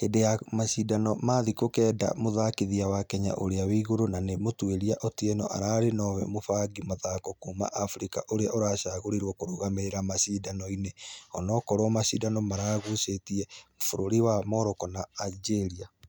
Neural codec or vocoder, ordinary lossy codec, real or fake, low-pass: none; none; real; none